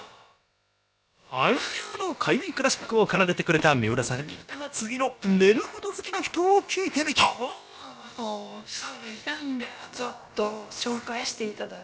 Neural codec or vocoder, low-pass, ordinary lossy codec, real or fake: codec, 16 kHz, about 1 kbps, DyCAST, with the encoder's durations; none; none; fake